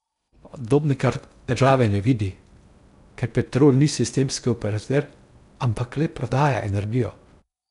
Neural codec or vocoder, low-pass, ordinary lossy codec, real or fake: codec, 16 kHz in and 24 kHz out, 0.6 kbps, FocalCodec, streaming, 2048 codes; 10.8 kHz; none; fake